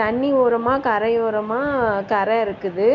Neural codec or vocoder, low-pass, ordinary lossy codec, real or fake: none; 7.2 kHz; MP3, 64 kbps; real